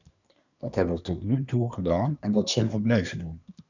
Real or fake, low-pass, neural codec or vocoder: fake; 7.2 kHz; codec, 24 kHz, 1 kbps, SNAC